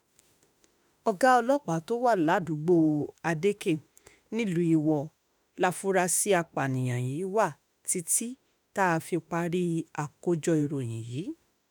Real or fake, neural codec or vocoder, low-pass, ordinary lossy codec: fake; autoencoder, 48 kHz, 32 numbers a frame, DAC-VAE, trained on Japanese speech; none; none